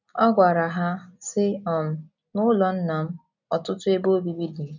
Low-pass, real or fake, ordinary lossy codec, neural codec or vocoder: 7.2 kHz; real; none; none